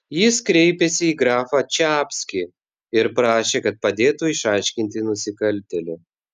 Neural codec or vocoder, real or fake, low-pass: none; real; 14.4 kHz